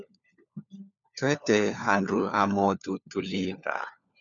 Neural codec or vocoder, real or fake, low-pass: codec, 16 kHz, 4 kbps, FunCodec, trained on LibriTTS, 50 frames a second; fake; 7.2 kHz